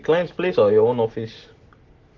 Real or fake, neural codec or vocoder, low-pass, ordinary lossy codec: real; none; 7.2 kHz; Opus, 16 kbps